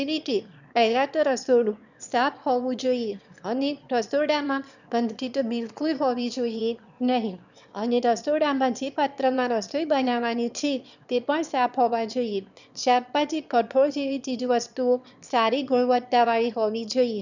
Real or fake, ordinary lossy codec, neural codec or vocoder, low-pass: fake; none; autoencoder, 22.05 kHz, a latent of 192 numbers a frame, VITS, trained on one speaker; 7.2 kHz